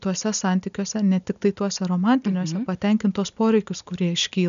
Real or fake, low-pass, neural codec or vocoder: real; 7.2 kHz; none